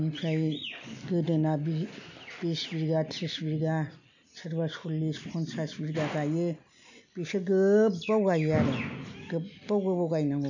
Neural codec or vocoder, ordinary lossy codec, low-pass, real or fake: none; none; 7.2 kHz; real